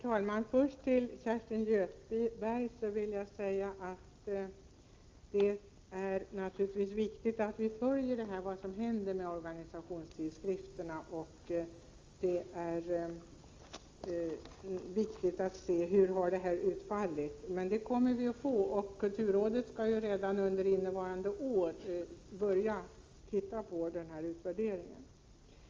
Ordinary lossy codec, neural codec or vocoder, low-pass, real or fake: Opus, 32 kbps; none; 7.2 kHz; real